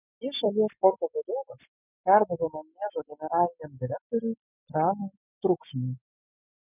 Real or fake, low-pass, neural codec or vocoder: real; 3.6 kHz; none